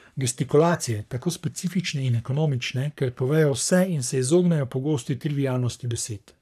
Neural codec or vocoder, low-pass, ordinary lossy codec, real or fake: codec, 44.1 kHz, 3.4 kbps, Pupu-Codec; 14.4 kHz; none; fake